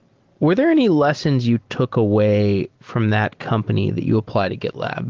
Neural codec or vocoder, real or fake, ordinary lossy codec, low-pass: none; real; Opus, 16 kbps; 7.2 kHz